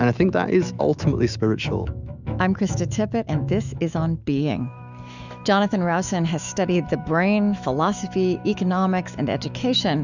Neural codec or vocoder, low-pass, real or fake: autoencoder, 48 kHz, 128 numbers a frame, DAC-VAE, trained on Japanese speech; 7.2 kHz; fake